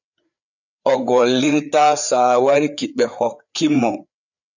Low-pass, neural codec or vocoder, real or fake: 7.2 kHz; codec, 16 kHz, 4 kbps, FreqCodec, larger model; fake